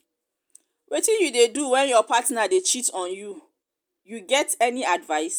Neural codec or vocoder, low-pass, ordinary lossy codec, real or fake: none; none; none; real